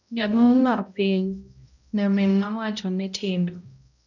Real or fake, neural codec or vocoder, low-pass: fake; codec, 16 kHz, 0.5 kbps, X-Codec, HuBERT features, trained on balanced general audio; 7.2 kHz